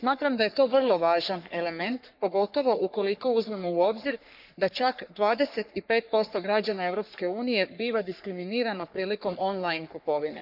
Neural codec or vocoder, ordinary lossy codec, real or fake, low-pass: codec, 44.1 kHz, 3.4 kbps, Pupu-Codec; none; fake; 5.4 kHz